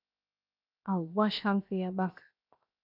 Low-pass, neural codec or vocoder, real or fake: 5.4 kHz; codec, 16 kHz, 0.7 kbps, FocalCodec; fake